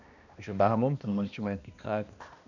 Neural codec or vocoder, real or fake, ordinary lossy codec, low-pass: codec, 16 kHz, 1 kbps, X-Codec, HuBERT features, trained on balanced general audio; fake; none; 7.2 kHz